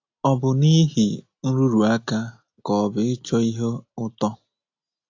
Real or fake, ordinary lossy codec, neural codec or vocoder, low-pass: real; AAC, 48 kbps; none; 7.2 kHz